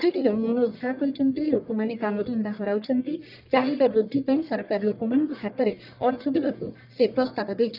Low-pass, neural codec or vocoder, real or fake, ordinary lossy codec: 5.4 kHz; codec, 44.1 kHz, 1.7 kbps, Pupu-Codec; fake; none